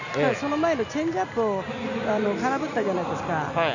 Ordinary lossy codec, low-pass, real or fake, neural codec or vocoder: none; 7.2 kHz; real; none